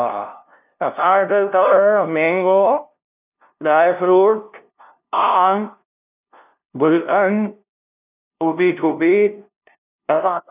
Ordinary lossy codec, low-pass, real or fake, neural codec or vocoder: none; 3.6 kHz; fake; codec, 16 kHz, 0.5 kbps, FunCodec, trained on LibriTTS, 25 frames a second